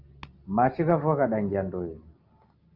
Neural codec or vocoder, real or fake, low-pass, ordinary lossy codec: none; real; 5.4 kHz; Opus, 24 kbps